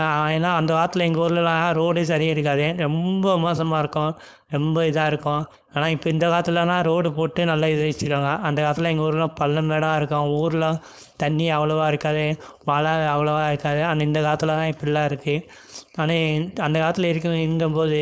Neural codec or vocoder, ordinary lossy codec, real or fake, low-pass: codec, 16 kHz, 4.8 kbps, FACodec; none; fake; none